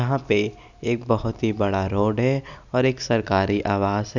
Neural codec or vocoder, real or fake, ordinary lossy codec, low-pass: none; real; none; 7.2 kHz